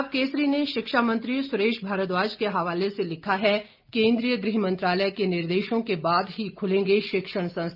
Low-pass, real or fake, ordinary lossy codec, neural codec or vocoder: 5.4 kHz; real; Opus, 24 kbps; none